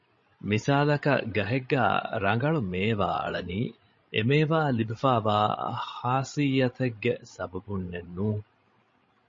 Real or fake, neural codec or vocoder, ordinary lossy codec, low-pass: fake; codec, 16 kHz, 16 kbps, FreqCodec, larger model; MP3, 32 kbps; 7.2 kHz